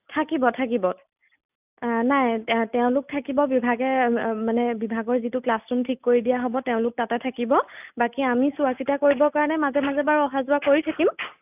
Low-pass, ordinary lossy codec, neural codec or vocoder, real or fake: 3.6 kHz; none; none; real